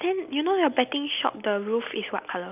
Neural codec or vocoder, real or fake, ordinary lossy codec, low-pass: none; real; none; 3.6 kHz